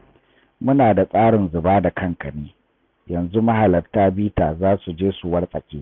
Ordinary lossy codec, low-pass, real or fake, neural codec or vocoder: none; none; real; none